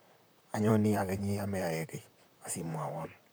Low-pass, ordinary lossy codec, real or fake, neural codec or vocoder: none; none; fake; vocoder, 44.1 kHz, 128 mel bands, Pupu-Vocoder